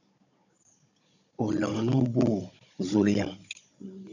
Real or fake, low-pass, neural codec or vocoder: fake; 7.2 kHz; codec, 16 kHz, 16 kbps, FunCodec, trained on Chinese and English, 50 frames a second